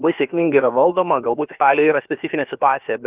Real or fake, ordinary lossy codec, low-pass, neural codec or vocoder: fake; Opus, 32 kbps; 3.6 kHz; codec, 16 kHz, about 1 kbps, DyCAST, with the encoder's durations